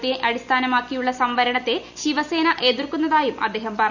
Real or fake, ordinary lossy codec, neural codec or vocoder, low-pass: real; none; none; 7.2 kHz